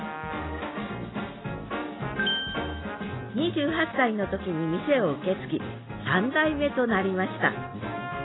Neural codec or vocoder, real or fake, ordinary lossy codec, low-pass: none; real; AAC, 16 kbps; 7.2 kHz